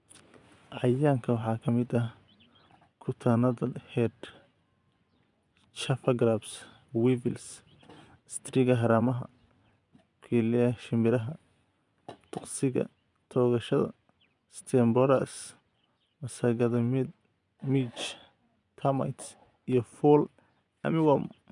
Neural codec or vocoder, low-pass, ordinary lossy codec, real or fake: vocoder, 24 kHz, 100 mel bands, Vocos; 10.8 kHz; none; fake